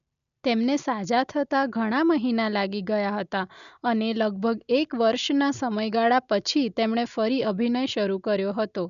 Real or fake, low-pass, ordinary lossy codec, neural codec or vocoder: real; 7.2 kHz; none; none